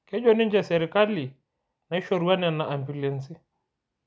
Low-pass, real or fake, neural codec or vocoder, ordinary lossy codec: none; real; none; none